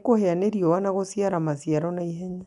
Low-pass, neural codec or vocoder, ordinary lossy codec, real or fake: 10.8 kHz; none; MP3, 96 kbps; real